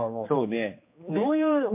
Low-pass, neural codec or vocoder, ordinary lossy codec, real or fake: 3.6 kHz; codec, 16 kHz, 2 kbps, X-Codec, HuBERT features, trained on general audio; MP3, 32 kbps; fake